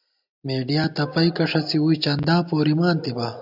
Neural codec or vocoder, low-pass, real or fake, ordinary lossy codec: none; 5.4 kHz; real; AAC, 48 kbps